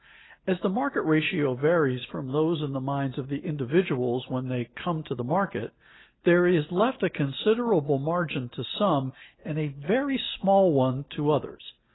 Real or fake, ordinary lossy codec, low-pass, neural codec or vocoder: real; AAC, 16 kbps; 7.2 kHz; none